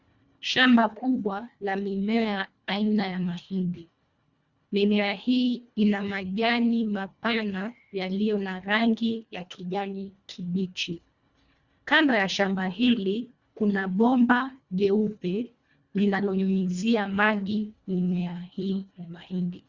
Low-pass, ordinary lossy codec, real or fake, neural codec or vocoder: 7.2 kHz; Opus, 64 kbps; fake; codec, 24 kHz, 1.5 kbps, HILCodec